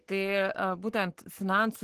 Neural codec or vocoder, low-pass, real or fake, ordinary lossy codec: codec, 44.1 kHz, 7.8 kbps, Pupu-Codec; 14.4 kHz; fake; Opus, 16 kbps